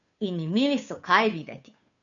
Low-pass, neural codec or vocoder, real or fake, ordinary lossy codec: 7.2 kHz; codec, 16 kHz, 2 kbps, FunCodec, trained on Chinese and English, 25 frames a second; fake; none